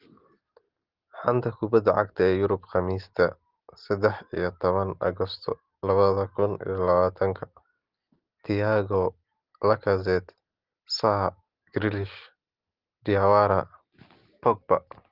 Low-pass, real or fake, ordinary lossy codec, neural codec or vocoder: 5.4 kHz; real; Opus, 16 kbps; none